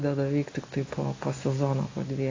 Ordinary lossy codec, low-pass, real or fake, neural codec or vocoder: AAC, 32 kbps; 7.2 kHz; real; none